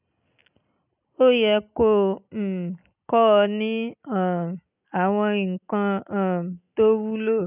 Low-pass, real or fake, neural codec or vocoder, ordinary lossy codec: 3.6 kHz; real; none; none